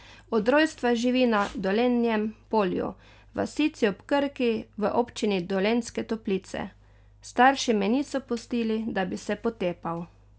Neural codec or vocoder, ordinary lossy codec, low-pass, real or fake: none; none; none; real